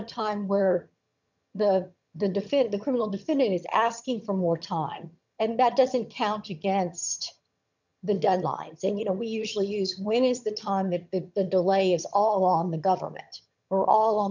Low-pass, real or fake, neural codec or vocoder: 7.2 kHz; fake; vocoder, 22.05 kHz, 80 mel bands, HiFi-GAN